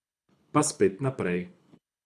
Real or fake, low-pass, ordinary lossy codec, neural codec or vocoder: fake; none; none; codec, 24 kHz, 6 kbps, HILCodec